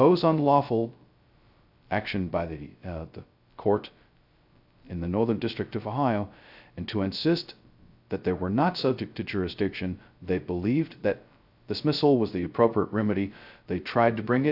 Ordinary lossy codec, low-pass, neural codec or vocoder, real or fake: AAC, 48 kbps; 5.4 kHz; codec, 16 kHz, 0.2 kbps, FocalCodec; fake